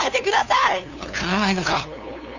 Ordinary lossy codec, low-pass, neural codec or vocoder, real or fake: none; 7.2 kHz; codec, 16 kHz, 2 kbps, FunCodec, trained on LibriTTS, 25 frames a second; fake